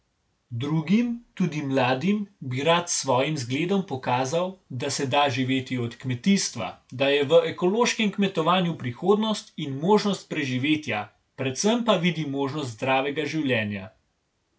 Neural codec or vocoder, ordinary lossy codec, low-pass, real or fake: none; none; none; real